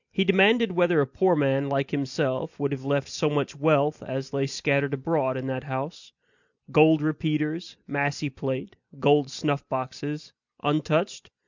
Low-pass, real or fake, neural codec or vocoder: 7.2 kHz; real; none